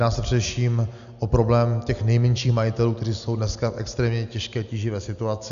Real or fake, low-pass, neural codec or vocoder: real; 7.2 kHz; none